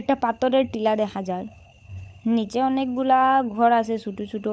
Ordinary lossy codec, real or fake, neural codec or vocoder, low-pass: none; fake; codec, 16 kHz, 16 kbps, FunCodec, trained on LibriTTS, 50 frames a second; none